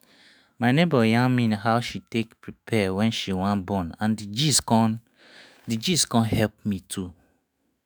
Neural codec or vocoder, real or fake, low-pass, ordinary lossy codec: autoencoder, 48 kHz, 128 numbers a frame, DAC-VAE, trained on Japanese speech; fake; none; none